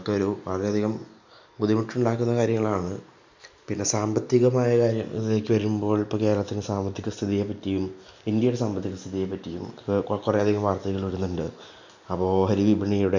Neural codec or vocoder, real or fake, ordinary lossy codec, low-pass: none; real; MP3, 64 kbps; 7.2 kHz